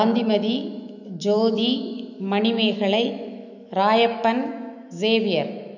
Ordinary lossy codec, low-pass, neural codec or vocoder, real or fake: none; 7.2 kHz; autoencoder, 48 kHz, 128 numbers a frame, DAC-VAE, trained on Japanese speech; fake